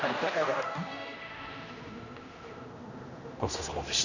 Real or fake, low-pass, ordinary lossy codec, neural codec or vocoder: fake; 7.2 kHz; none; codec, 16 kHz, 1 kbps, X-Codec, HuBERT features, trained on general audio